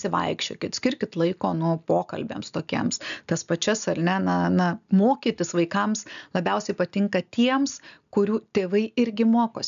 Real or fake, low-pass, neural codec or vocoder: real; 7.2 kHz; none